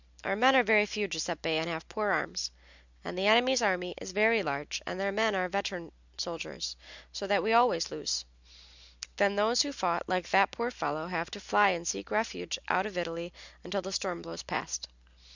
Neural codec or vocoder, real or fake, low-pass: none; real; 7.2 kHz